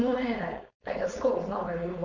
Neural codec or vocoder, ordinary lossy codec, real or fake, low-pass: codec, 16 kHz, 4.8 kbps, FACodec; none; fake; 7.2 kHz